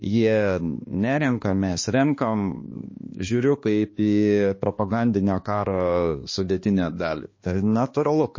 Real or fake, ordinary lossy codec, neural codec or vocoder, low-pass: fake; MP3, 32 kbps; codec, 16 kHz, 2 kbps, X-Codec, HuBERT features, trained on balanced general audio; 7.2 kHz